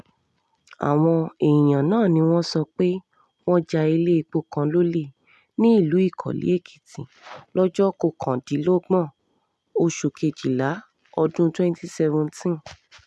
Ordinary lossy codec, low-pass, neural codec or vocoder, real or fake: none; none; none; real